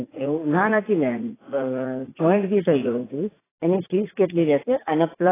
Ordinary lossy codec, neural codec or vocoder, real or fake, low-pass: AAC, 16 kbps; vocoder, 44.1 kHz, 80 mel bands, Vocos; fake; 3.6 kHz